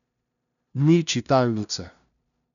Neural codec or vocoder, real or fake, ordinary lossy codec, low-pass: codec, 16 kHz, 0.5 kbps, FunCodec, trained on LibriTTS, 25 frames a second; fake; none; 7.2 kHz